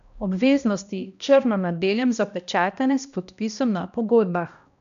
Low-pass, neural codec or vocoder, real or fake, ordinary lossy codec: 7.2 kHz; codec, 16 kHz, 1 kbps, X-Codec, HuBERT features, trained on balanced general audio; fake; none